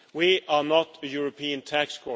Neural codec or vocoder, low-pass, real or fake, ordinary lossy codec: none; none; real; none